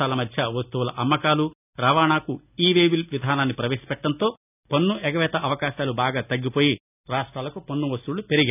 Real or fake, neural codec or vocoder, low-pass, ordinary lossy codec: real; none; 3.6 kHz; none